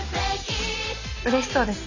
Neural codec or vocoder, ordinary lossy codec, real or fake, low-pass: none; none; real; 7.2 kHz